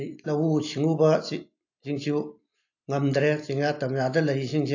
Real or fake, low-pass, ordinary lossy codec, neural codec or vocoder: real; 7.2 kHz; AAC, 48 kbps; none